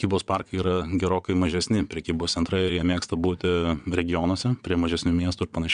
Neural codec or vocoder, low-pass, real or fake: vocoder, 22.05 kHz, 80 mel bands, WaveNeXt; 9.9 kHz; fake